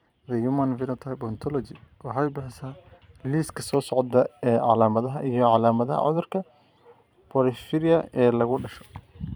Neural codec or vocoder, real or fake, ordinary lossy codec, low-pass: vocoder, 44.1 kHz, 128 mel bands every 512 samples, BigVGAN v2; fake; none; none